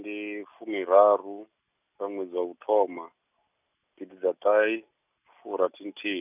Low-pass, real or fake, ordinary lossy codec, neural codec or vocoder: 3.6 kHz; real; none; none